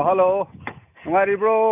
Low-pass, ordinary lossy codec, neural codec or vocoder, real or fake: 3.6 kHz; none; none; real